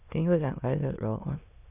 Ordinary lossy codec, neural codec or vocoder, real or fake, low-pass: none; autoencoder, 22.05 kHz, a latent of 192 numbers a frame, VITS, trained on many speakers; fake; 3.6 kHz